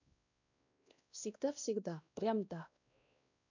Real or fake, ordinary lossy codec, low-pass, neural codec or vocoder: fake; none; 7.2 kHz; codec, 16 kHz, 1 kbps, X-Codec, WavLM features, trained on Multilingual LibriSpeech